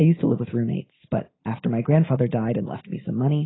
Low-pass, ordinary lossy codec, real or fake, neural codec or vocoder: 7.2 kHz; AAC, 16 kbps; real; none